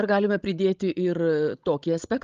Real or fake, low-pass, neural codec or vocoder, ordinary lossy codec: fake; 7.2 kHz; codec, 16 kHz, 16 kbps, FreqCodec, larger model; Opus, 32 kbps